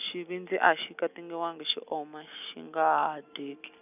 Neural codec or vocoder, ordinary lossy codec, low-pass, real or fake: none; none; 3.6 kHz; real